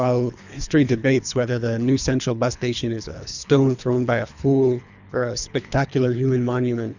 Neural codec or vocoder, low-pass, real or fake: codec, 24 kHz, 3 kbps, HILCodec; 7.2 kHz; fake